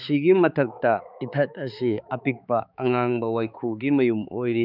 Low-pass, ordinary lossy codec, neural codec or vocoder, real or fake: 5.4 kHz; none; codec, 16 kHz, 4 kbps, X-Codec, HuBERT features, trained on balanced general audio; fake